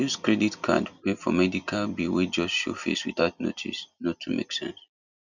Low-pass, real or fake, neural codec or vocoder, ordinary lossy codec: 7.2 kHz; real; none; none